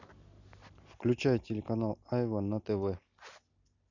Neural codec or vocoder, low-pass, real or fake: none; 7.2 kHz; real